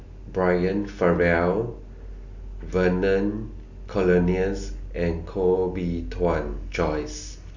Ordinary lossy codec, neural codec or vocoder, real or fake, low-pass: none; none; real; 7.2 kHz